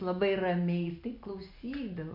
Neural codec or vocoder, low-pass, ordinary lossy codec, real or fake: none; 5.4 kHz; MP3, 48 kbps; real